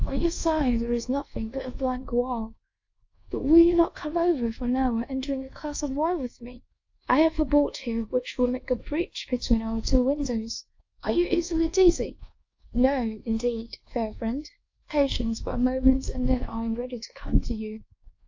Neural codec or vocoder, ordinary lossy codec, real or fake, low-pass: codec, 24 kHz, 1.2 kbps, DualCodec; AAC, 48 kbps; fake; 7.2 kHz